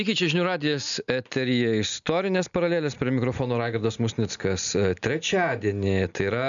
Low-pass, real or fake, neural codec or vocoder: 7.2 kHz; real; none